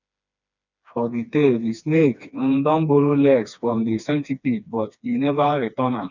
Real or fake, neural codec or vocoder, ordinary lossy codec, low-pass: fake; codec, 16 kHz, 2 kbps, FreqCodec, smaller model; none; 7.2 kHz